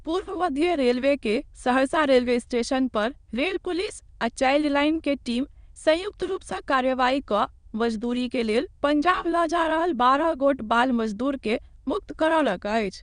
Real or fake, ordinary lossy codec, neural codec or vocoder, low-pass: fake; Opus, 64 kbps; autoencoder, 22.05 kHz, a latent of 192 numbers a frame, VITS, trained on many speakers; 9.9 kHz